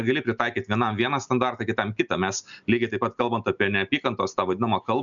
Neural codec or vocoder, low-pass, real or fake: none; 7.2 kHz; real